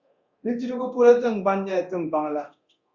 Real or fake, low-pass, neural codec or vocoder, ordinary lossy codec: fake; 7.2 kHz; codec, 24 kHz, 0.9 kbps, DualCodec; Opus, 64 kbps